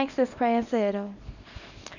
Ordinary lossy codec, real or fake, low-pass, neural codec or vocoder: none; fake; 7.2 kHz; codec, 24 kHz, 0.9 kbps, WavTokenizer, small release